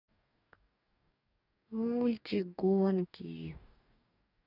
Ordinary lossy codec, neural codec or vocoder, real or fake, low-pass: none; codec, 44.1 kHz, 2.6 kbps, DAC; fake; 5.4 kHz